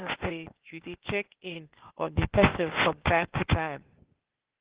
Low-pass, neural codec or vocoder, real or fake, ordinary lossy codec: 3.6 kHz; codec, 16 kHz, 0.8 kbps, ZipCodec; fake; Opus, 16 kbps